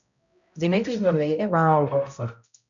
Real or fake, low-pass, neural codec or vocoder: fake; 7.2 kHz; codec, 16 kHz, 0.5 kbps, X-Codec, HuBERT features, trained on balanced general audio